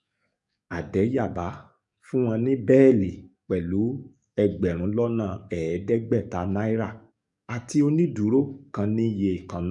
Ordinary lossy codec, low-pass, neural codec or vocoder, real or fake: none; 10.8 kHz; codec, 44.1 kHz, 7.8 kbps, DAC; fake